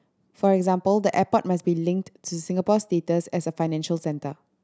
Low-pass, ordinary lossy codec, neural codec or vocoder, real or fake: none; none; none; real